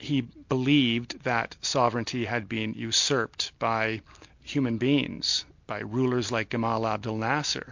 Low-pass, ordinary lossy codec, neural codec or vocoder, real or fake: 7.2 kHz; MP3, 48 kbps; none; real